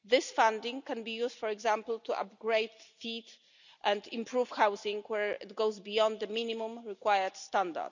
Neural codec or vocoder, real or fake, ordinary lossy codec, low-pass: none; real; none; 7.2 kHz